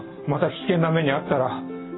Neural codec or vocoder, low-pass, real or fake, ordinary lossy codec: none; 7.2 kHz; real; AAC, 16 kbps